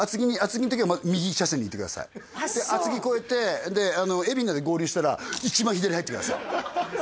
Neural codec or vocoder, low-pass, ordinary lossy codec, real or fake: none; none; none; real